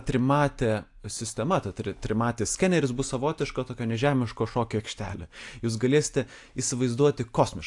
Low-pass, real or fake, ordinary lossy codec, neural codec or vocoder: 10.8 kHz; real; AAC, 64 kbps; none